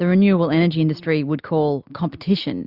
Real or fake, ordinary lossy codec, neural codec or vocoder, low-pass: real; Opus, 64 kbps; none; 5.4 kHz